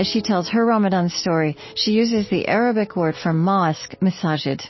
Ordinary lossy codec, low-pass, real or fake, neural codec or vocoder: MP3, 24 kbps; 7.2 kHz; real; none